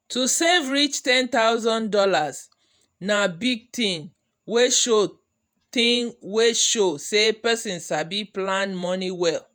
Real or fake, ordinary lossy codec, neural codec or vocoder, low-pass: fake; none; vocoder, 48 kHz, 128 mel bands, Vocos; none